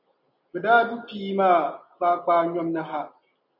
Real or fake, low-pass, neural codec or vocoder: real; 5.4 kHz; none